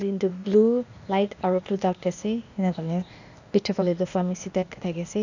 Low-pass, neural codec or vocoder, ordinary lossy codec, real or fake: 7.2 kHz; codec, 16 kHz, 0.8 kbps, ZipCodec; none; fake